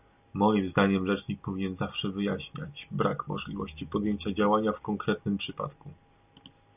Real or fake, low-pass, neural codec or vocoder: real; 3.6 kHz; none